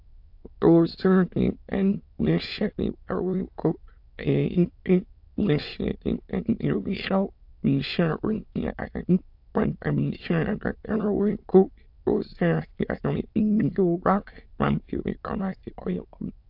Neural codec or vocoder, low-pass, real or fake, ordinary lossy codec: autoencoder, 22.05 kHz, a latent of 192 numbers a frame, VITS, trained on many speakers; 5.4 kHz; fake; AAC, 48 kbps